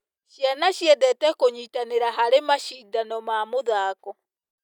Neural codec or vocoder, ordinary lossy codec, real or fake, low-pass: none; none; real; 19.8 kHz